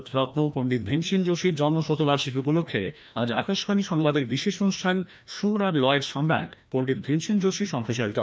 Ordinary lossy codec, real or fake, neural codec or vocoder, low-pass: none; fake; codec, 16 kHz, 1 kbps, FreqCodec, larger model; none